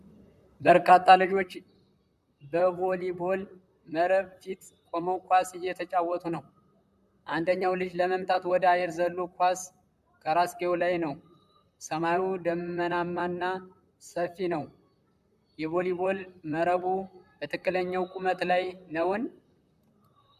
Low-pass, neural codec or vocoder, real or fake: 14.4 kHz; vocoder, 44.1 kHz, 128 mel bands, Pupu-Vocoder; fake